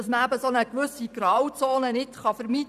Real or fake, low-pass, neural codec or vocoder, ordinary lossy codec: fake; 14.4 kHz; vocoder, 44.1 kHz, 128 mel bands every 512 samples, BigVGAN v2; none